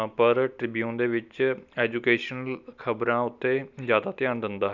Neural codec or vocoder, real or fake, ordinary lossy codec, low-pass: none; real; none; 7.2 kHz